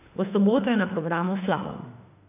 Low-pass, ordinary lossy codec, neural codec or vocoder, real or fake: 3.6 kHz; none; autoencoder, 48 kHz, 32 numbers a frame, DAC-VAE, trained on Japanese speech; fake